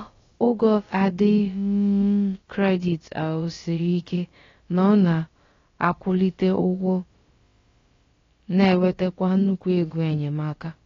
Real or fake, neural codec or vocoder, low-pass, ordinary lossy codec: fake; codec, 16 kHz, about 1 kbps, DyCAST, with the encoder's durations; 7.2 kHz; AAC, 32 kbps